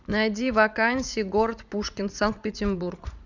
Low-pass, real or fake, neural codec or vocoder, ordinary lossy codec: 7.2 kHz; fake; vocoder, 44.1 kHz, 80 mel bands, Vocos; none